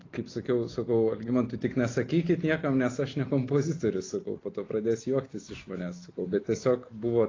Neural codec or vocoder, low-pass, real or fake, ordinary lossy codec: none; 7.2 kHz; real; AAC, 32 kbps